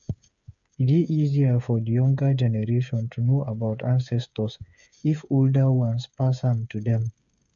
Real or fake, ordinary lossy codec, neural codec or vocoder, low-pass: fake; none; codec, 16 kHz, 8 kbps, FreqCodec, smaller model; 7.2 kHz